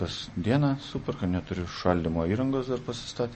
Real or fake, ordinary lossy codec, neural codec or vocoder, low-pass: real; MP3, 32 kbps; none; 10.8 kHz